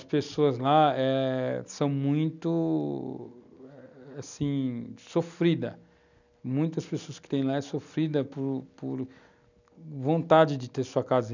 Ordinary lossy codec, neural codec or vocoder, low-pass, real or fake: none; none; 7.2 kHz; real